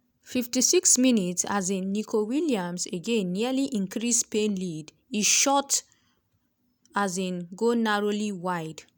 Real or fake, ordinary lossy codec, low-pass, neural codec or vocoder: real; none; none; none